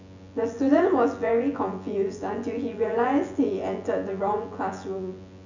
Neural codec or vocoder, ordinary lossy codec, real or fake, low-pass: vocoder, 24 kHz, 100 mel bands, Vocos; none; fake; 7.2 kHz